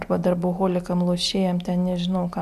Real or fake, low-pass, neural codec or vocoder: real; 14.4 kHz; none